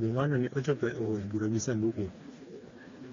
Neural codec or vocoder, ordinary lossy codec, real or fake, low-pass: codec, 16 kHz, 2 kbps, FreqCodec, smaller model; AAC, 32 kbps; fake; 7.2 kHz